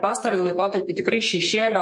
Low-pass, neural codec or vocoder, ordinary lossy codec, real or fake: 10.8 kHz; codec, 32 kHz, 1.9 kbps, SNAC; MP3, 48 kbps; fake